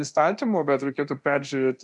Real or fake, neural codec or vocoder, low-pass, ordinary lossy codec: fake; codec, 24 kHz, 0.9 kbps, DualCodec; 10.8 kHz; MP3, 96 kbps